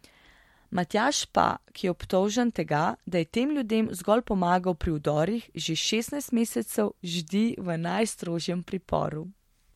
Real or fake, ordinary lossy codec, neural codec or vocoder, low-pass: real; MP3, 64 kbps; none; 19.8 kHz